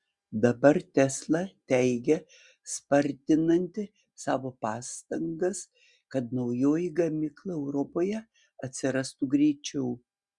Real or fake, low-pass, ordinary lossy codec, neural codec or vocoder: real; 10.8 kHz; Opus, 64 kbps; none